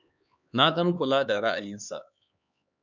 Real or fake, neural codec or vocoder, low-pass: fake; codec, 16 kHz, 4 kbps, X-Codec, HuBERT features, trained on LibriSpeech; 7.2 kHz